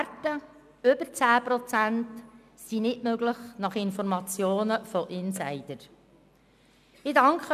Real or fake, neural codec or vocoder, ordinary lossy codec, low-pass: real; none; none; 14.4 kHz